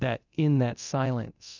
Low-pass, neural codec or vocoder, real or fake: 7.2 kHz; codec, 24 kHz, 0.9 kbps, DualCodec; fake